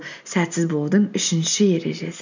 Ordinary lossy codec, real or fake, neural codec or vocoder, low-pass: none; real; none; 7.2 kHz